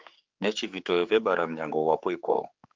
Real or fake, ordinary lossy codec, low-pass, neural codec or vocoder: fake; Opus, 24 kbps; 7.2 kHz; codec, 16 kHz, 4 kbps, X-Codec, HuBERT features, trained on general audio